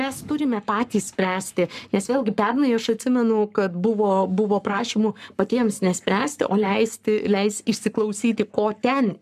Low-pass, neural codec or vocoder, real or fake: 14.4 kHz; codec, 44.1 kHz, 7.8 kbps, Pupu-Codec; fake